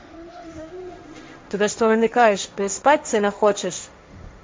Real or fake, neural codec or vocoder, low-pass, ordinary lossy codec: fake; codec, 16 kHz, 1.1 kbps, Voila-Tokenizer; none; none